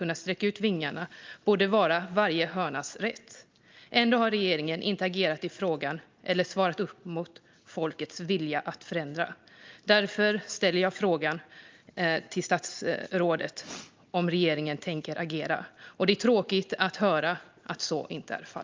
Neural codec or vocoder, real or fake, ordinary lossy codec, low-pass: none; real; Opus, 24 kbps; 7.2 kHz